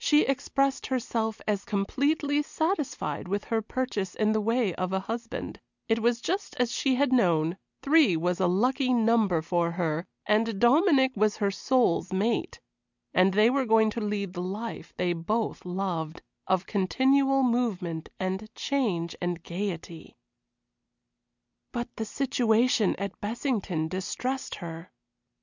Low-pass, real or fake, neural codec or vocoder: 7.2 kHz; real; none